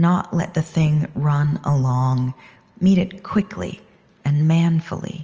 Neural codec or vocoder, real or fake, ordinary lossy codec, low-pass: none; real; Opus, 24 kbps; 7.2 kHz